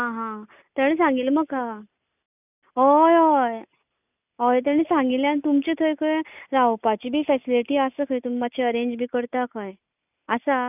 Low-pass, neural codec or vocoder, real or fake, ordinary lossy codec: 3.6 kHz; none; real; none